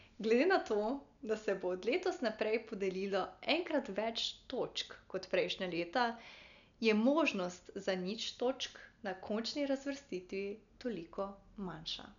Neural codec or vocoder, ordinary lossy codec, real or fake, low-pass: none; none; real; 7.2 kHz